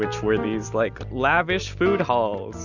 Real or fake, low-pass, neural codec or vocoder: real; 7.2 kHz; none